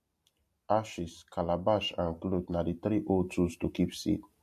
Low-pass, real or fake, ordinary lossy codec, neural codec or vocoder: 14.4 kHz; real; MP3, 64 kbps; none